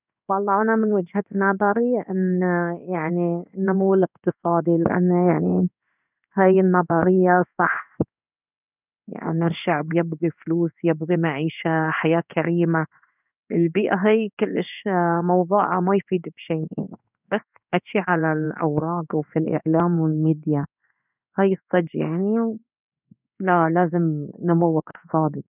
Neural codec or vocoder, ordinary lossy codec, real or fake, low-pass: codec, 16 kHz in and 24 kHz out, 1 kbps, XY-Tokenizer; none; fake; 3.6 kHz